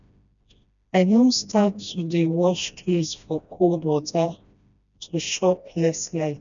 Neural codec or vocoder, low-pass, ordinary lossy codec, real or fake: codec, 16 kHz, 1 kbps, FreqCodec, smaller model; 7.2 kHz; none; fake